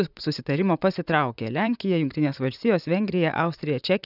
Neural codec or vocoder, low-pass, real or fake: vocoder, 44.1 kHz, 128 mel bands, Pupu-Vocoder; 5.4 kHz; fake